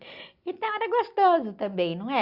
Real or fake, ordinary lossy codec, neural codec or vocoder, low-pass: real; Opus, 64 kbps; none; 5.4 kHz